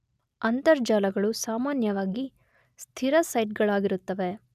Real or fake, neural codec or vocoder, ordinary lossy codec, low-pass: real; none; none; 14.4 kHz